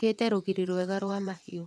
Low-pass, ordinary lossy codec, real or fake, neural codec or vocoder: none; none; fake; vocoder, 22.05 kHz, 80 mel bands, WaveNeXt